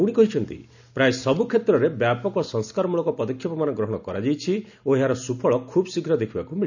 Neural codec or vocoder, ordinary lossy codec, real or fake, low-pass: none; none; real; 7.2 kHz